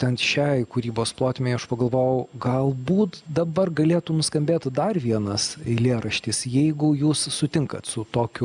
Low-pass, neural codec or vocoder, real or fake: 9.9 kHz; none; real